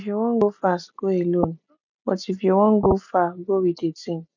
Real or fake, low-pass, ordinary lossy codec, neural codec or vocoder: real; 7.2 kHz; none; none